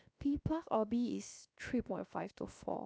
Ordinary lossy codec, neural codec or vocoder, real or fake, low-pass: none; codec, 16 kHz, 0.7 kbps, FocalCodec; fake; none